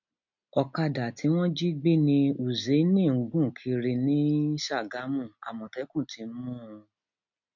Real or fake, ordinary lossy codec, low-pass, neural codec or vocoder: real; none; 7.2 kHz; none